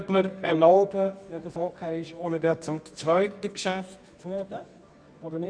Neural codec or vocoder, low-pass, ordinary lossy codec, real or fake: codec, 24 kHz, 0.9 kbps, WavTokenizer, medium music audio release; 9.9 kHz; none; fake